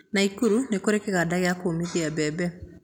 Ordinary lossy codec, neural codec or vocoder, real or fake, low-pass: none; none; real; 19.8 kHz